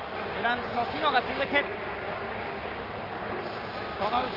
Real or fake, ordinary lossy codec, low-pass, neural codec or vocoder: fake; Opus, 24 kbps; 5.4 kHz; codec, 44.1 kHz, 7.8 kbps, Pupu-Codec